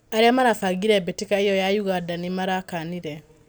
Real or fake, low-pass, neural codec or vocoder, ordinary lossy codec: real; none; none; none